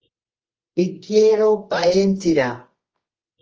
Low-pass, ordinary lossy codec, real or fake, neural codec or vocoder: 7.2 kHz; Opus, 24 kbps; fake; codec, 24 kHz, 0.9 kbps, WavTokenizer, medium music audio release